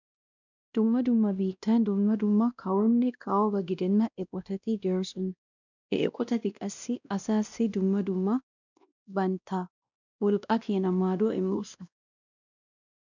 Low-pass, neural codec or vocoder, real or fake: 7.2 kHz; codec, 16 kHz, 1 kbps, X-Codec, WavLM features, trained on Multilingual LibriSpeech; fake